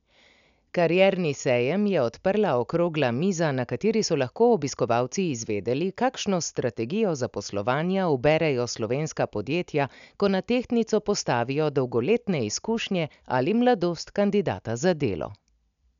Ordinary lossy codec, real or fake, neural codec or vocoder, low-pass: none; real; none; 7.2 kHz